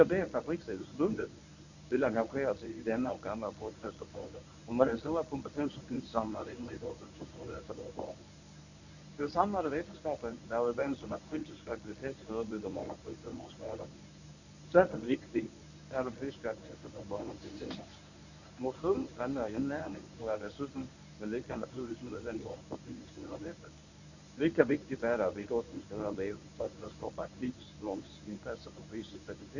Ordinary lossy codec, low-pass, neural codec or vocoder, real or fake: none; 7.2 kHz; codec, 24 kHz, 0.9 kbps, WavTokenizer, medium speech release version 1; fake